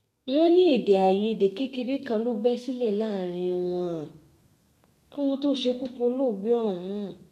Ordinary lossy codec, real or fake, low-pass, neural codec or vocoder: none; fake; 14.4 kHz; codec, 32 kHz, 1.9 kbps, SNAC